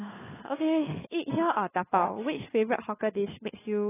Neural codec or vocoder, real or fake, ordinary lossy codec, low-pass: codec, 16 kHz, 4 kbps, FunCodec, trained on LibriTTS, 50 frames a second; fake; AAC, 16 kbps; 3.6 kHz